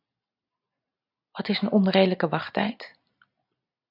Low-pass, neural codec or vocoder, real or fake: 5.4 kHz; none; real